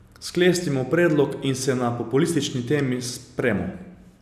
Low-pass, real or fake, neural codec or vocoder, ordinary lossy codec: 14.4 kHz; real; none; none